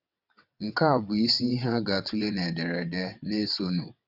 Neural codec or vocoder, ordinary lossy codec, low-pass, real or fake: vocoder, 22.05 kHz, 80 mel bands, WaveNeXt; none; 5.4 kHz; fake